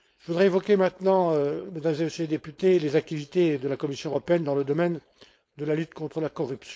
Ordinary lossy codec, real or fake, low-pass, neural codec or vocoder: none; fake; none; codec, 16 kHz, 4.8 kbps, FACodec